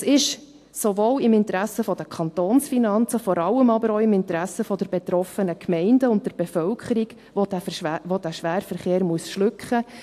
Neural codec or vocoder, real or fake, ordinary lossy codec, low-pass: none; real; AAC, 64 kbps; 14.4 kHz